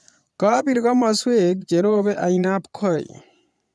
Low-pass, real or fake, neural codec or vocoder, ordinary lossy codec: none; fake; vocoder, 22.05 kHz, 80 mel bands, Vocos; none